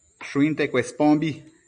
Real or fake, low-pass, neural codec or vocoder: real; 9.9 kHz; none